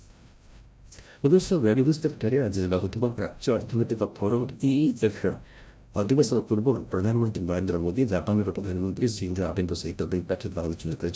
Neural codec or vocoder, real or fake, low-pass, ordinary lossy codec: codec, 16 kHz, 0.5 kbps, FreqCodec, larger model; fake; none; none